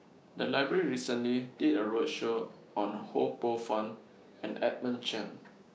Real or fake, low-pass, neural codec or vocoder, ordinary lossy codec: fake; none; codec, 16 kHz, 6 kbps, DAC; none